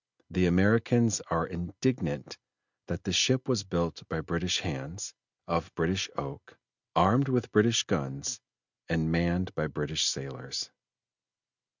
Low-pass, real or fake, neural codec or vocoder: 7.2 kHz; real; none